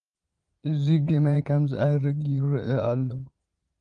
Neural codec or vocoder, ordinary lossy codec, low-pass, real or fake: vocoder, 22.05 kHz, 80 mel bands, Vocos; Opus, 32 kbps; 9.9 kHz; fake